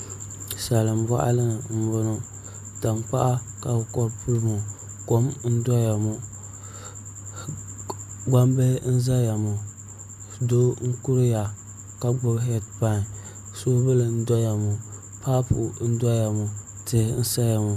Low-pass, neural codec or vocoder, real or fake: 14.4 kHz; none; real